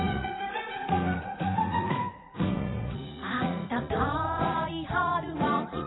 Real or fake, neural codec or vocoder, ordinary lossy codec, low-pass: fake; vocoder, 44.1 kHz, 80 mel bands, Vocos; AAC, 16 kbps; 7.2 kHz